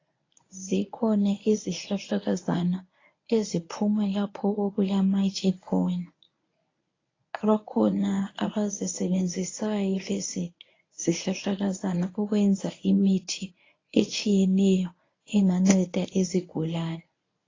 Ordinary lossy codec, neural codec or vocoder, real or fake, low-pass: AAC, 32 kbps; codec, 24 kHz, 0.9 kbps, WavTokenizer, medium speech release version 1; fake; 7.2 kHz